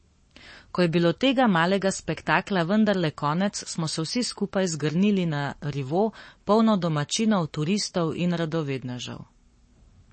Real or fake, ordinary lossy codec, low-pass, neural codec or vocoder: fake; MP3, 32 kbps; 9.9 kHz; codec, 44.1 kHz, 7.8 kbps, Pupu-Codec